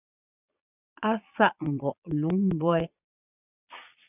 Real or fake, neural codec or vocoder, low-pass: fake; vocoder, 22.05 kHz, 80 mel bands, Vocos; 3.6 kHz